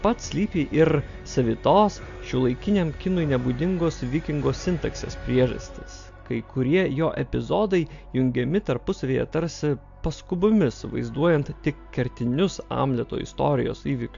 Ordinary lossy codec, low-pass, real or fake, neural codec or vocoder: AAC, 64 kbps; 7.2 kHz; real; none